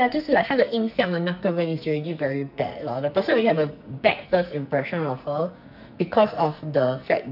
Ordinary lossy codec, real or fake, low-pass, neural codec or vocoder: none; fake; 5.4 kHz; codec, 44.1 kHz, 2.6 kbps, SNAC